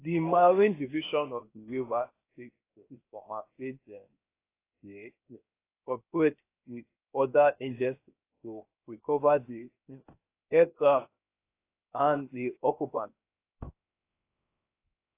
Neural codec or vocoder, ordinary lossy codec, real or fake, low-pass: codec, 16 kHz, 0.7 kbps, FocalCodec; AAC, 24 kbps; fake; 3.6 kHz